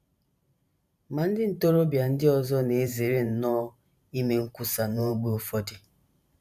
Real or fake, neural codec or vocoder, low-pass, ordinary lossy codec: fake; vocoder, 44.1 kHz, 128 mel bands every 512 samples, BigVGAN v2; 14.4 kHz; none